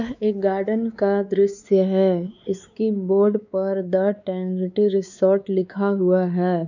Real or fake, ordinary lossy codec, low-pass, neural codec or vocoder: fake; none; 7.2 kHz; codec, 16 kHz, 4 kbps, X-Codec, WavLM features, trained on Multilingual LibriSpeech